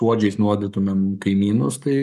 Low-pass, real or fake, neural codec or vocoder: 14.4 kHz; fake; codec, 44.1 kHz, 7.8 kbps, Pupu-Codec